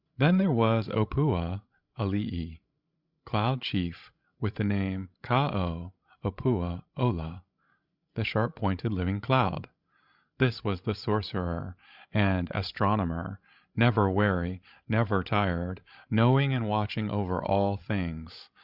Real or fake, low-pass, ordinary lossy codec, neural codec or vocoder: fake; 5.4 kHz; Opus, 64 kbps; codec, 16 kHz, 16 kbps, FreqCodec, larger model